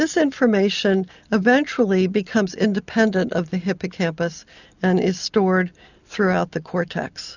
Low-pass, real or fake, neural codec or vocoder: 7.2 kHz; real; none